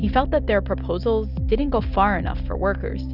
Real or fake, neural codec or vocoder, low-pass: real; none; 5.4 kHz